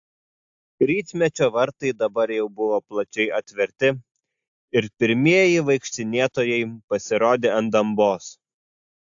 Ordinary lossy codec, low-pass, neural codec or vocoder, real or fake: AAC, 64 kbps; 7.2 kHz; none; real